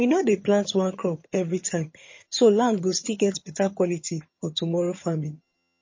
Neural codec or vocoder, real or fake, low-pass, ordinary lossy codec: vocoder, 22.05 kHz, 80 mel bands, HiFi-GAN; fake; 7.2 kHz; MP3, 32 kbps